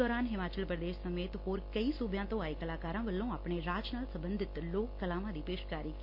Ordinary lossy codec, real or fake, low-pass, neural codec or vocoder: MP3, 32 kbps; real; 5.4 kHz; none